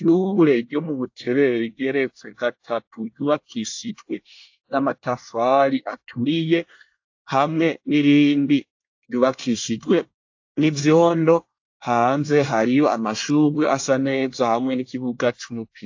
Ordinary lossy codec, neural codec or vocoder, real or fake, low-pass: AAC, 48 kbps; codec, 24 kHz, 1 kbps, SNAC; fake; 7.2 kHz